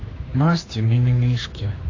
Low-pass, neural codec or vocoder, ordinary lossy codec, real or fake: 7.2 kHz; codec, 16 kHz, 2 kbps, X-Codec, HuBERT features, trained on general audio; AAC, 32 kbps; fake